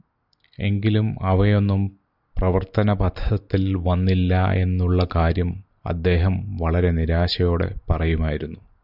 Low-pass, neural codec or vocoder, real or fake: 5.4 kHz; none; real